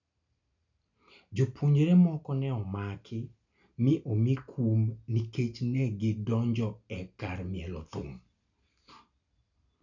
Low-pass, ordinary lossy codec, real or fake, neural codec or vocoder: 7.2 kHz; none; real; none